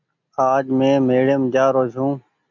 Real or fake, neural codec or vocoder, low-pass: real; none; 7.2 kHz